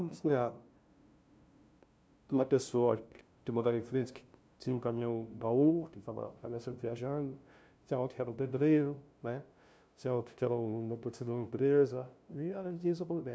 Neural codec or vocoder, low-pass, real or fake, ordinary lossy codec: codec, 16 kHz, 0.5 kbps, FunCodec, trained on LibriTTS, 25 frames a second; none; fake; none